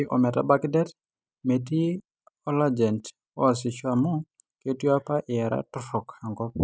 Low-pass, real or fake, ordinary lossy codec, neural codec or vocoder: none; real; none; none